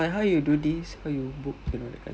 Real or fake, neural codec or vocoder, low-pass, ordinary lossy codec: real; none; none; none